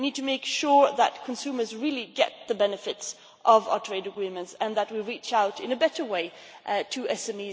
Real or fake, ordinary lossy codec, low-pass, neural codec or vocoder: real; none; none; none